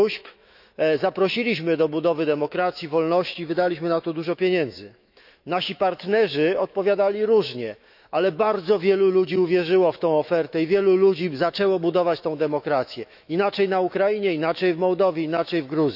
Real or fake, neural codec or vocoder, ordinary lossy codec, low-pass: fake; autoencoder, 48 kHz, 128 numbers a frame, DAC-VAE, trained on Japanese speech; none; 5.4 kHz